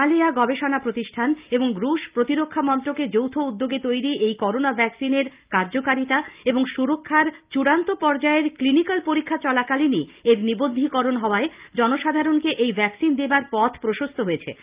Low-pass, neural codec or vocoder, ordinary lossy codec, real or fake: 3.6 kHz; none; Opus, 24 kbps; real